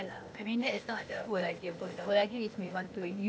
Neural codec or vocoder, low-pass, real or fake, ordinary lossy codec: codec, 16 kHz, 0.8 kbps, ZipCodec; none; fake; none